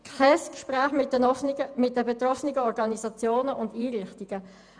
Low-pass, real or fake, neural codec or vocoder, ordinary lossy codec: 9.9 kHz; fake; vocoder, 48 kHz, 128 mel bands, Vocos; none